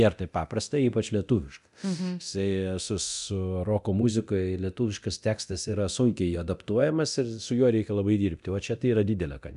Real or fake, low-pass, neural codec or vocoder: fake; 10.8 kHz; codec, 24 kHz, 0.9 kbps, DualCodec